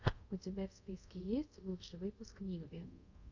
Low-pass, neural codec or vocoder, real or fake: 7.2 kHz; codec, 24 kHz, 0.5 kbps, DualCodec; fake